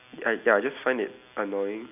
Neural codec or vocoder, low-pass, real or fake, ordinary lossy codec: none; 3.6 kHz; real; none